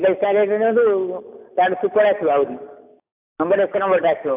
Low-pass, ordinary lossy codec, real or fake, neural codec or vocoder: 3.6 kHz; AAC, 32 kbps; real; none